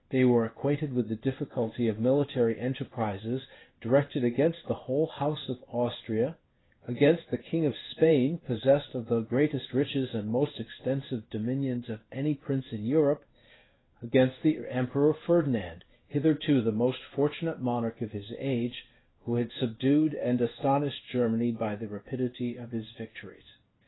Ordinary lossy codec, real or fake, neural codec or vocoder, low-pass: AAC, 16 kbps; fake; codec, 16 kHz in and 24 kHz out, 1 kbps, XY-Tokenizer; 7.2 kHz